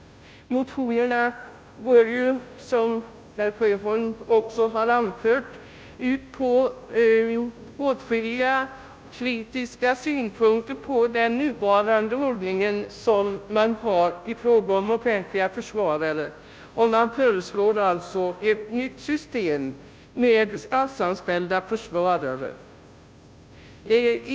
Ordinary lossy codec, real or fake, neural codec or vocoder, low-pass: none; fake; codec, 16 kHz, 0.5 kbps, FunCodec, trained on Chinese and English, 25 frames a second; none